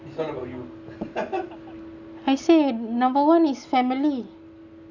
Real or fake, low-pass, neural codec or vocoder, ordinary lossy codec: real; 7.2 kHz; none; none